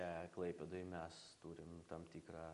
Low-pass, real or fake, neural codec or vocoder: 10.8 kHz; real; none